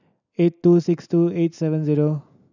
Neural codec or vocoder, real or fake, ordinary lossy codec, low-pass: none; real; none; 7.2 kHz